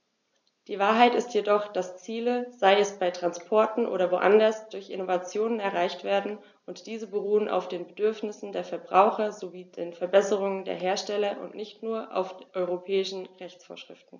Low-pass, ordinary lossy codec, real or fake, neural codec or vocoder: 7.2 kHz; none; real; none